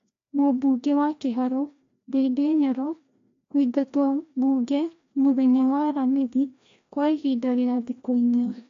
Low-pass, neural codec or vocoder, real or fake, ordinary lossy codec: 7.2 kHz; codec, 16 kHz, 1 kbps, FreqCodec, larger model; fake; AAC, 48 kbps